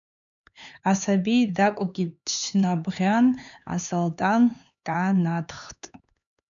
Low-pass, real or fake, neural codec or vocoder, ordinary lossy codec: 7.2 kHz; fake; codec, 16 kHz, 4 kbps, X-Codec, HuBERT features, trained on LibriSpeech; MP3, 96 kbps